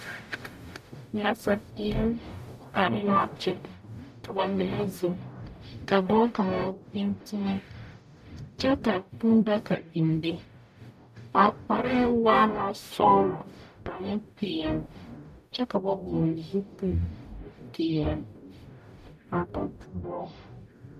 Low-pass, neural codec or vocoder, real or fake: 14.4 kHz; codec, 44.1 kHz, 0.9 kbps, DAC; fake